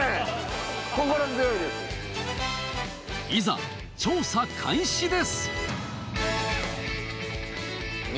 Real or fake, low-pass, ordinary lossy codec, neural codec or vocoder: real; none; none; none